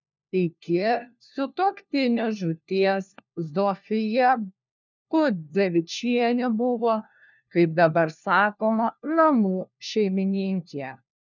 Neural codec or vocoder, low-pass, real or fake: codec, 16 kHz, 1 kbps, FunCodec, trained on LibriTTS, 50 frames a second; 7.2 kHz; fake